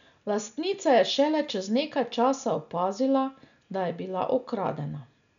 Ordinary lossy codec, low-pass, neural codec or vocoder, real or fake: none; 7.2 kHz; none; real